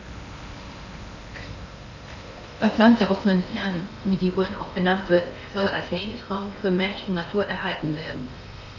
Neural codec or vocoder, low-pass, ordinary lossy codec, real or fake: codec, 16 kHz in and 24 kHz out, 0.8 kbps, FocalCodec, streaming, 65536 codes; 7.2 kHz; none; fake